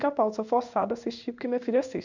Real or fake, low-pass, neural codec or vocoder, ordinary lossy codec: fake; 7.2 kHz; codec, 16 kHz in and 24 kHz out, 1 kbps, XY-Tokenizer; none